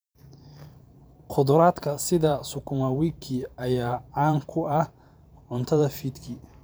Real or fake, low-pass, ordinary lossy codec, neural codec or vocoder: fake; none; none; vocoder, 44.1 kHz, 128 mel bands every 512 samples, BigVGAN v2